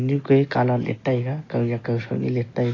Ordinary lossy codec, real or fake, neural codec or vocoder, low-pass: AAC, 32 kbps; real; none; 7.2 kHz